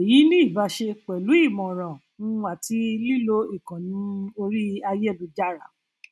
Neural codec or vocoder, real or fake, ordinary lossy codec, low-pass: none; real; none; none